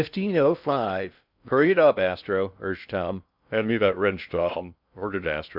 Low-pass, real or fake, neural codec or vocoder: 5.4 kHz; fake; codec, 16 kHz in and 24 kHz out, 0.6 kbps, FocalCodec, streaming, 4096 codes